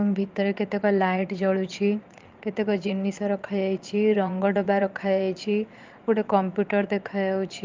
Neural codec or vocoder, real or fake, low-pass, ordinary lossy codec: vocoder, 22.05 kHz, 80 mel bands, Vocos; fake; 7.2 kHz; Opus, 32 kbps